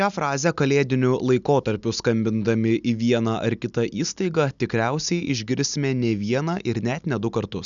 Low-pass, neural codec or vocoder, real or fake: 7.2 kHz; none; real